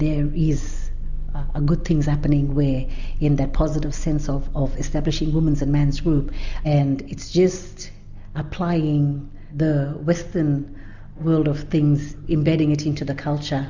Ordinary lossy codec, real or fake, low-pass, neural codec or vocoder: Opus, 64 kbps; real; 7.2 kHz; none